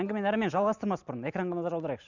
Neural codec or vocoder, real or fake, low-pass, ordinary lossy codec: none; real; 7.2 kHz; none